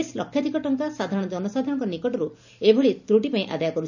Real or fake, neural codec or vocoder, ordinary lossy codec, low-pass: real; none; none; 7.2 kHz